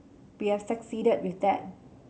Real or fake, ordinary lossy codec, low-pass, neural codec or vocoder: real; none; none; none